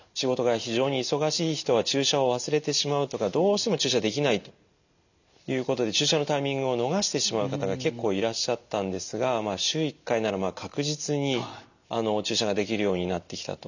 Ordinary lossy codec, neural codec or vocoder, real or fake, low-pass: none; none; real; 7.2 kHz